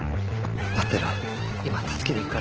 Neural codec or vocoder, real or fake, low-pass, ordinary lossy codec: none; real; 7.2 kHz; Opus, 16 kbps